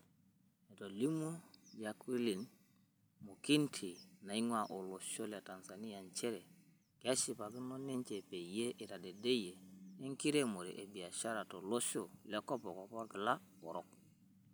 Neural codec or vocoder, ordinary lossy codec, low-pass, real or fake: none; none; none; real